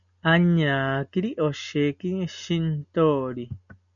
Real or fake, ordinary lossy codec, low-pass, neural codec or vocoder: real; MP3, 96 kbps; 7.2 kHz; none